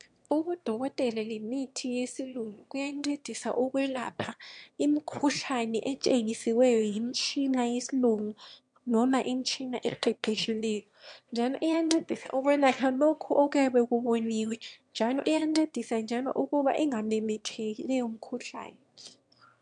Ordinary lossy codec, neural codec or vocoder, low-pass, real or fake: MP3, 64 kbps; autoencoder, 22.05 kHz, a latent of 192 numbers a frame, VITS, trained on one speaker; 9.9 kHz; fake